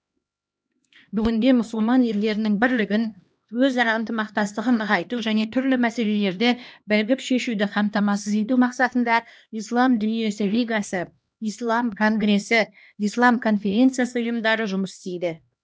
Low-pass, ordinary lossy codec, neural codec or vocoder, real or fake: none; none; codec, 16 kHz, 1 kbps, X-Codec, HuBERT features, trained on LibriSpeech; fake